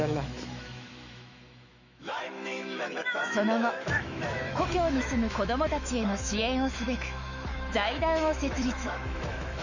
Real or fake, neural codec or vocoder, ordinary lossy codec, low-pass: fake; autoencoder, 48 kHz, 128 numbers a frame, DAC-VAE, trained on Japanese speech; none; 7.2 kHz